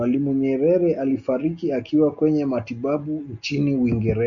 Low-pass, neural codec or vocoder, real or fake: 7.2 kHz; none; real